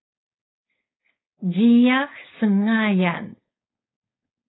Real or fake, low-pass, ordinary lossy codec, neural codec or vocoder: fake; 7.2 kHz; AAC, 16 kbps; codec, 16 kHz, 4.8 kbps, FACodec